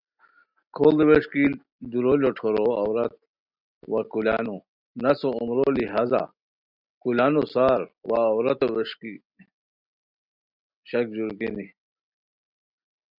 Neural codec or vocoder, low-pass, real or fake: none; 5.4 kHz; real